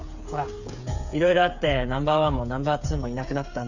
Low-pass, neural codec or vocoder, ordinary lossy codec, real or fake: 7.2 kHz; codec, 16 kHz, 8 kbps, FreqCodec, smaller model; none; fake